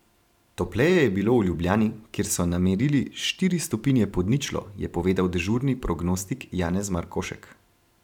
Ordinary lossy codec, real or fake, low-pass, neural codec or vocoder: none; fake; 19.8 kHz; vocoder, 44.1 kHz, 128 mel bands every 512 samples, BigVGAN v2